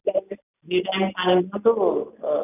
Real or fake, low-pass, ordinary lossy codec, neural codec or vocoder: real; 3.6 kHz; none; none